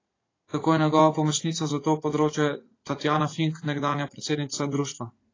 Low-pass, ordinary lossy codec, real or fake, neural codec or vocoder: 7.2 kHz; AAC, 32 kbps; fake; vocoder, 44.1 kHz, 80 mel bands, Vocos